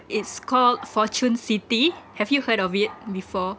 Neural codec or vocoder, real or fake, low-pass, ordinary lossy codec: none; real; none; none